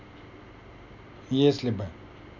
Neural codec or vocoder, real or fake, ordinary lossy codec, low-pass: none; real; none; 7.2 kHz